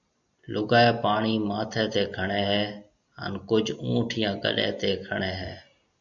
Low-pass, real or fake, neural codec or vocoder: 7.2 kHz; real; none